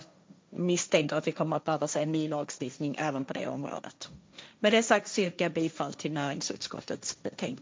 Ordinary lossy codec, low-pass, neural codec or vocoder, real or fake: none; none; codec, 16 kHz, 1.1 kbps, Voila-Tokenizer; fake